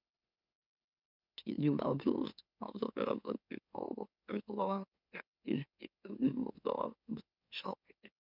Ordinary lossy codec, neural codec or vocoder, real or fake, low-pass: none; autoencoder, 44.1 kHz, a latent of 192 numbers a frame, MeloTTS; fake; 5.4 kHz